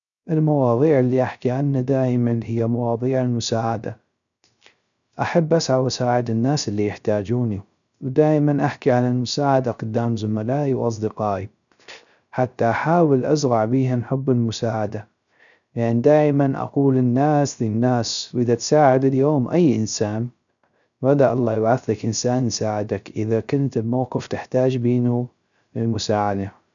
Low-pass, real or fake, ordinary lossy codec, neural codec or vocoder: 7.2 kHz; fake; none; codec, 16 kHz, 0.3 kbps, FocalCodec